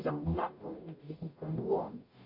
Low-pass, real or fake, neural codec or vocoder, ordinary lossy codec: 5.4 kHz; fake; codec, 44.1 kHz, 0.9 kbps, DAC; AAC, 32 kbps